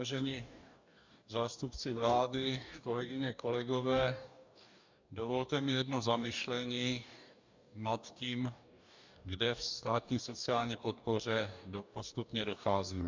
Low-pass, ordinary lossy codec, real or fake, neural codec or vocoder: 7.2 kHz; MP3, 64 kbps; fake; codec, 44.1 kHz, 2.6 kbps, DAC